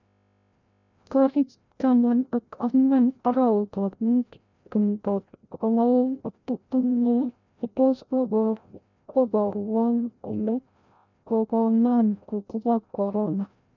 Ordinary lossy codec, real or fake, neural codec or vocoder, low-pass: none; fake; codec, 16 kHz, 0.5 kbps, FreqCodec, larger model; 7.2 kHz